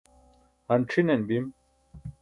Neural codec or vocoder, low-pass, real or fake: autoencoder, 48 kHz, 128 numbers a frame, DAC-VAE, trained on Japanese speech; 10.8 kHz; fake